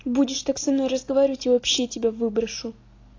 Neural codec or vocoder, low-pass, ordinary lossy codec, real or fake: none; 7.2 kHz; AAC, 32 kbps; real